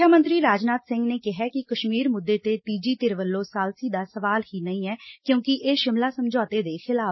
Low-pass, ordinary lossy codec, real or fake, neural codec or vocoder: 7.2 kHz; MP3, 24 kbps; real; none